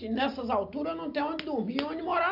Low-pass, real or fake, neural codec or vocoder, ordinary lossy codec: 5.4 kHz; real; none; none